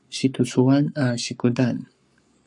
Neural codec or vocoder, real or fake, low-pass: codec, 44.1 kHz, 7.8 kbps, Pupu-Codec; fake; 10.8 kHz